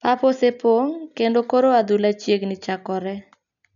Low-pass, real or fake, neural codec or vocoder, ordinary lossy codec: 7.2 kHz; real; none; none